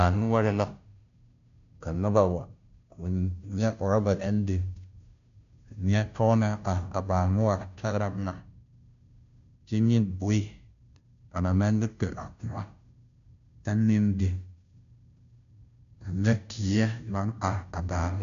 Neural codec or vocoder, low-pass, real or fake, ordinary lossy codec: codec, 16 kHz, 0.5 kbps, FunCodec, trained on Chinese and English, 25 frames a second; 7.2 kHz; fake; AAC, 96 kbps